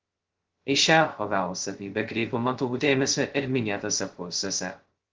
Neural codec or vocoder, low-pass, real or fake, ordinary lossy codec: codec, 16 kHz, 0.2 kbps, FocalCodec; 7.2 kHz; fake; Opus, 16 kbps